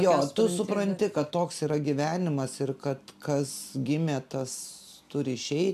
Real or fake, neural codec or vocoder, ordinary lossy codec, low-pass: real; none; AAC, 96 kbps; 14.4 kHz